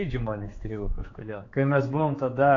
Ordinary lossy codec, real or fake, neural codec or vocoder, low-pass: AAC, 32 kbps; fake; codec, 16 kHz, 4 kbps, X-Codec, HuBERT features, trained on general audio; 7.2 kHz